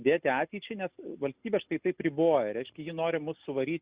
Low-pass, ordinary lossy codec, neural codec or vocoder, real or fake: 3.6 kHz; Opus, 64 kbps; none; real